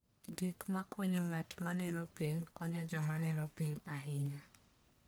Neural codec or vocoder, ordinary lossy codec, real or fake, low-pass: codec, 44.1 kHz, 1.7 kbps, Pupu-Codec; none; fake; none